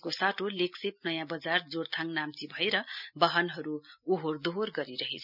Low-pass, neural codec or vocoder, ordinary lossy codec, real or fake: 5.4 kHz; none; none; real